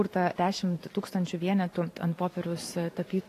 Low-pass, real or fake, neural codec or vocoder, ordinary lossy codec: 14.4 kHz; fake; vocoder, 44.1 kHz, 128 mel bands every 512 samples, BigVGAN v2; AAC, 48 kbps